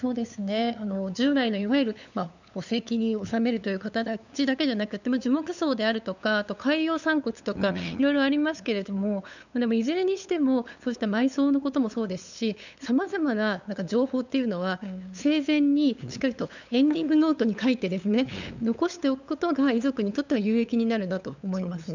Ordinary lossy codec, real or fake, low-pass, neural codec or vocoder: none; fake; 7.2 kHz; codec, 16 kHz, 8 kbps, FunCodec, trained on LibriTTS, 25 frames a second